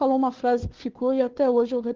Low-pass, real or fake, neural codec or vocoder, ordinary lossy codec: 7.2 kHz; fake; codec, 16 kHz, 2 kbps, FunCodec, trained on Chinese and English, 25 frames a second; Opus, 16 kbps